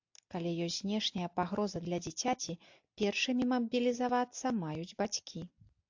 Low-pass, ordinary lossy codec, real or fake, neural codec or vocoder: 7.2 kHz; AAC, 48 kbps; real; none